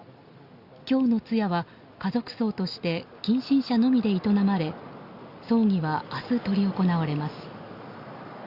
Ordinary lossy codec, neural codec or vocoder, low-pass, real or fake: Opus, 64 kbps; none; 5.4 kHz; real